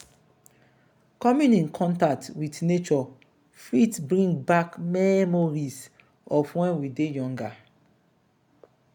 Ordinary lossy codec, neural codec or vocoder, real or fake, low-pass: none; none; real; 19.8 kHz